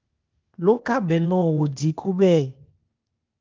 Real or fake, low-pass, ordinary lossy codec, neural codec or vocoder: fake; 7.2 kHz; Opus, 32 kbps; codec, 16 kHz, 0.8 kbps, ZipCodec